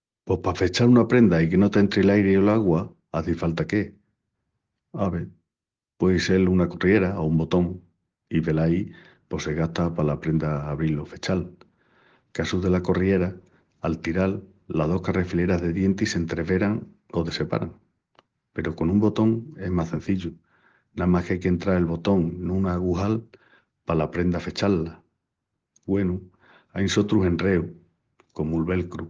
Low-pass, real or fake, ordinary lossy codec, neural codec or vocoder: 7.2 kHz; real; Opus, 16 kbps; none